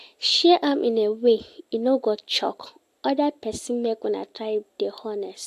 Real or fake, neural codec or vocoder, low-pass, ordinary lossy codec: real; none; 14.4 kHz; AAC, 64 kbps